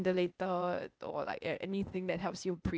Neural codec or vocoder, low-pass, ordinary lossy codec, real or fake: codec, 16 kHz, 0.8 kbps, ZipCodec; none; none; fake